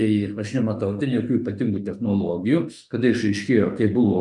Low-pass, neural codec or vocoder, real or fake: 10.8 kHz; autoencoder, 48 kHz, 32 numbers a frame, DAC-VAE, trained on Japanese speech; fake